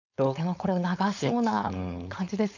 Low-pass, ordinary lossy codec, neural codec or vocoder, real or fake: 7.2 kHz; none; codec, 16 kHz, 4.8 kbps, FACodec; fake